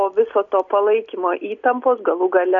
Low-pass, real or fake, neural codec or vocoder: 7.2 kHz; real; none